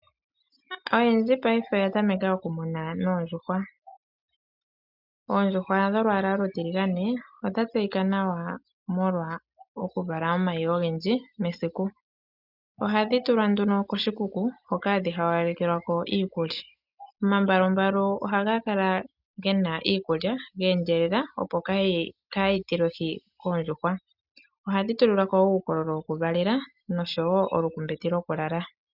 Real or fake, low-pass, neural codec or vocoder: real; 5.4 kHz; none